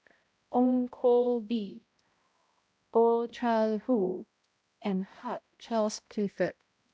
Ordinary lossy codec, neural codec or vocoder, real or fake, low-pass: none; codec, 16 kHz, 0.5 kbps, X-Codec, HuBERT features, trained on balanced general audio; fake; none